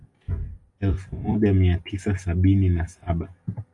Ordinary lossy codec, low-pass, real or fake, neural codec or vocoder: AAC, 64 kbps; 10.8 kHz; real; none